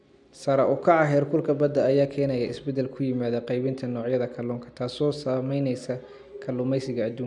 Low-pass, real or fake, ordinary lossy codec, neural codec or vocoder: 10.8 kHz; real; none; none